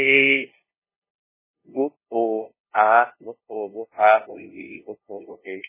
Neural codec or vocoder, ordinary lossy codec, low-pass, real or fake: codec, 16 kHz, 0.5 kbps, FunCodec, trained on LibriTTS, 25 frames a second; MP3, 16 kbps; 3.6 kHz; fake